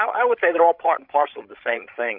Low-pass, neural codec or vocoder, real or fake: 5.4 kHz; codec, 16 kHz, 16 kbps, FreqCodec, larger model; fake